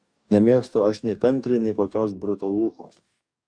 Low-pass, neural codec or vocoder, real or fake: 9.9 kHz; codec, 44.1 kHz, 2.6 kbps, DAC; fake